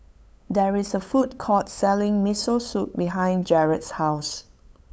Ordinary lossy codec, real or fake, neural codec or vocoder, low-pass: none; fake; codec, 16 kHz, 8 kbps, FunCodec, trained on LibriTTS, 25 frames a second; none